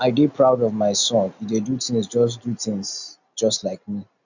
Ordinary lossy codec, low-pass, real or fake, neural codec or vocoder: none; 7.2 kHz; real; none